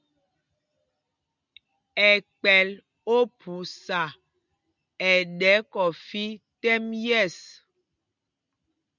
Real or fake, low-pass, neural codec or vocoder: real; 7.2 kHz; none